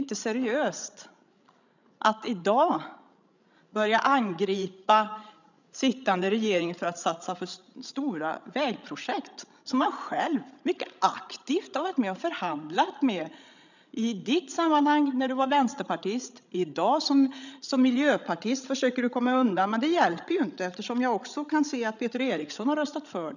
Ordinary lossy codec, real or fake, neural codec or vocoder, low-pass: none; fake; codec, 16 kHz, 16 kbps, FreqCodec, larger model; 7.2 kHz